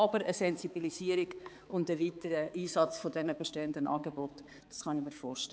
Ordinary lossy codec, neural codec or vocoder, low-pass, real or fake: none; codec, 16 kHz, 4 kbps, X-Codec, HuBERT features, trained on balanced general audio; none; fake